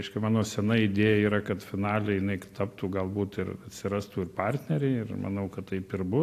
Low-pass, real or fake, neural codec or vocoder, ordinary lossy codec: 14.4 kHz; real; none; AAC, 64 kbps